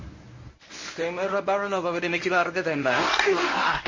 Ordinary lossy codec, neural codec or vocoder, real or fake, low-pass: MP3, 32 kbps; codec, 24 kHz, 0.9 kbps, WavTokenizer, medium speech release version 1; fake; 7.2 kHz